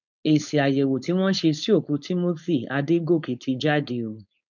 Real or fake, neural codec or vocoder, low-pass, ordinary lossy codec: fake; codec, 16 kHz, 4.8 kbps, FACodec; 7.2 kHz; none